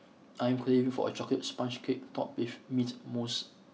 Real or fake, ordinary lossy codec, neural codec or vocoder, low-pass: real; none; none; none